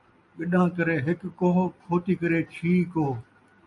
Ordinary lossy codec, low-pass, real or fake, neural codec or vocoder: AAC, 64 kbps; 10.8 kHz; real; none